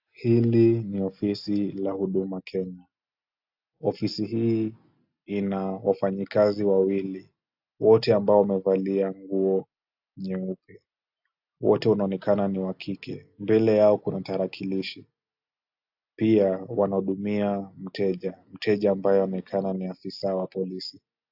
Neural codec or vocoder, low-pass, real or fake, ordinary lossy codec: none; 5.4 kHz; real; AAC, 48 kbps